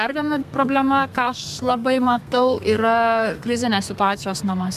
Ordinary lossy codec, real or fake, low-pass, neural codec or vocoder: MP3, 96 kbps; fake; 14.4 kHz; codec, 32 kHz, 1.9 kbps, SNAC